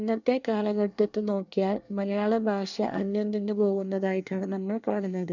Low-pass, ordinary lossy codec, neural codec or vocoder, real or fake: 7.2 kHz; none; codec, 24 kHz, 1 kbps, SNAC; fake